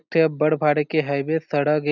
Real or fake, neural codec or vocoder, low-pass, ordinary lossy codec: real; none; 7.2 kHz; none